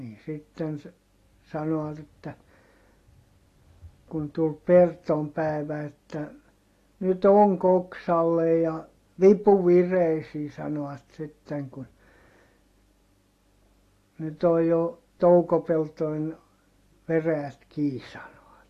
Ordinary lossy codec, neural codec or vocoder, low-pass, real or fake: AAC, 48 kbps; none; 14.4 kHz; real